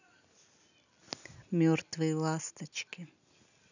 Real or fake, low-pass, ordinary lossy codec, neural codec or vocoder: real; 7.2 kHz; none; none